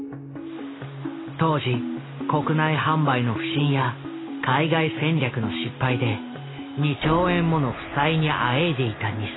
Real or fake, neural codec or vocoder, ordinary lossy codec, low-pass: real; none; AAC, 16 kbps; 7.2 kHz